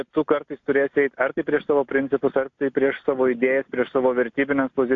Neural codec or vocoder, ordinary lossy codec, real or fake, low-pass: none; AAC, 48 kbps; real; 7.2 kHz